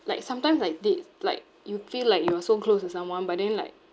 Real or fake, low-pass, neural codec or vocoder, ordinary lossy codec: real; none; none; none